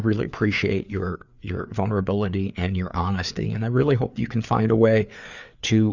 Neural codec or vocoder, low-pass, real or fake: codec, 16 kHz, 4 kbps, FreqCodec, larger model; 7.2 kHz; fake